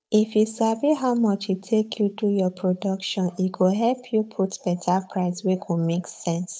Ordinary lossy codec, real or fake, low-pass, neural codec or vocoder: none; fake; none; codec, 16 kHz, 8 kbps, FunCodec, trained on Chinese and English, 25 frames a second